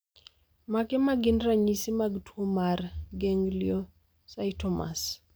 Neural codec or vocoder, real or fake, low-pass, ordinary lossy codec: none; real; none; none